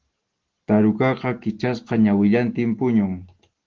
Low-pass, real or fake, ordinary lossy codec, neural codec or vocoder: 7.2 kHz; real; Opus, 16 kbps; none